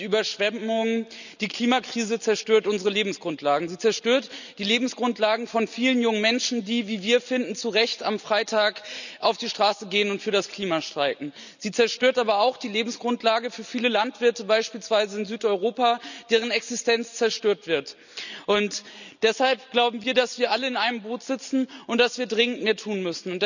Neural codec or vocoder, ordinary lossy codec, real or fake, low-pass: none; none; real; 7.2 kHz